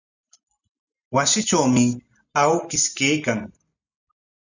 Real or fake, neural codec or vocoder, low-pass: real; none; 7.2 kHz